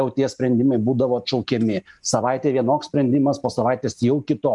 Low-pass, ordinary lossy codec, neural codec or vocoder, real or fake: 10.8 kHz; MP3, 96 kbps; none; real